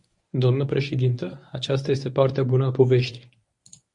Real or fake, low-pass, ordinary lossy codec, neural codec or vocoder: fake; 10.8 kHz; MP3, 48 kbps; codec, 24 kHz, 0.9 kbps, WavTokenizer, medium speech release version 1